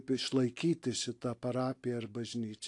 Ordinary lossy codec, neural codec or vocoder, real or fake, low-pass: AAC, 48 kbps; none; real; 10.8 kHz